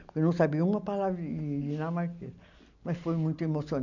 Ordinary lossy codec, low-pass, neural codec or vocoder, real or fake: none; 7.2 kHz; none; real